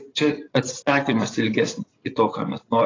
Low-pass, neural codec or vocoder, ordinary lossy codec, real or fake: 7.2 kHz; vocoder, 24 kHz, 100 mel bands, Vocos; AAC, 32 kbps; fake